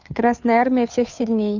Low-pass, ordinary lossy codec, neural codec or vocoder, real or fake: 7.2 kHz; none; codec, 16 kHz, 2 kbps, FunCodec, trained on Chinese and English, 25 frames a second; fake